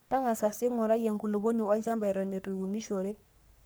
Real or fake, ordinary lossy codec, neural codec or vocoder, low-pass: fake; none; codec, 44.1 kHz, 3.4 kbps, Pupu-Codec; none